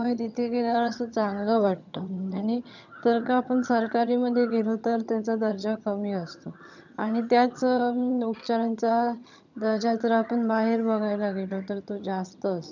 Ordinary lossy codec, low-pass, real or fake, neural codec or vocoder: none; 7.2 kHz; fake; vocoder, 22.05 kHz, 80 mel bands, HiFi-GAN